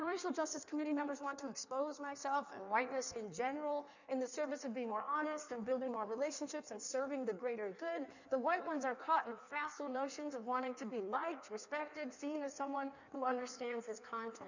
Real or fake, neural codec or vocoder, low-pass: fake; codec, 16 kHz in and 24 kHz out, 1.1 kbps, FireRedTTS-2 codec; 7.2 kHz